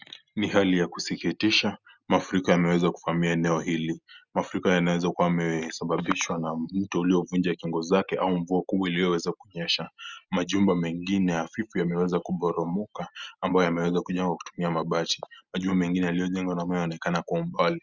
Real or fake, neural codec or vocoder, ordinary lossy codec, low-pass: real; none; Opus, 64 kbps; 7.2 kHz